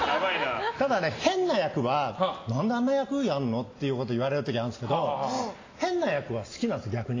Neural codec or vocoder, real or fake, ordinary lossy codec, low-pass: none; real; AAC, 32 kbps; 7.2 kHz